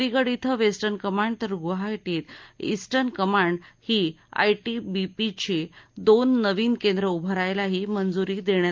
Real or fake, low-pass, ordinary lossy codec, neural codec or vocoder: real; 7.2 kHz; Opus, 24 kbps; none